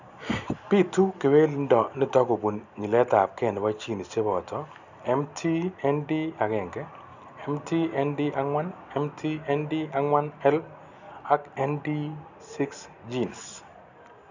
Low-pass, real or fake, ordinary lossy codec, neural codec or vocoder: 7.2 kHz; real; none; none